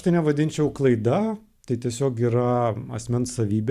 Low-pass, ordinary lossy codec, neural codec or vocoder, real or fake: 14.4 kHz; Opus, 64 kbps; vocoder, 44.1 kHz, 128 mel bands every 512 samples, BigVGAN v2; fake